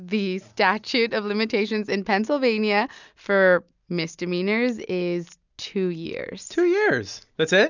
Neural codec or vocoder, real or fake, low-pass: none; real; 7.2 kHz